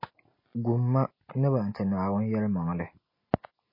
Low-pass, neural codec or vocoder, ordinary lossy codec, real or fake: 5.4 kHz; none; MP3, 24 kbps; real